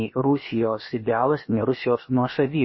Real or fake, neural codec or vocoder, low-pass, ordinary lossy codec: fake; codec, 16 kHz, about 1 kbps, DyCAST, with the encoder's durations; 7.2 kHz; MP3, 24 kbps